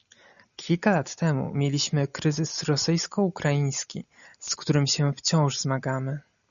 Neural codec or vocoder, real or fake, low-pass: none; real; 7.2 kHz